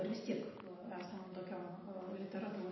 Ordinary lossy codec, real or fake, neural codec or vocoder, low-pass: MP3, 24 kbps; real; none; 7.2 kHz